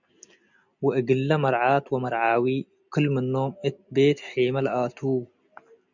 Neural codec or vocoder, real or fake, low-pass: none; real; 7.2 kHz